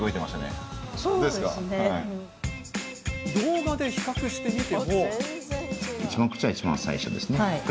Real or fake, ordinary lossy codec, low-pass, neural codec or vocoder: real; none; none; none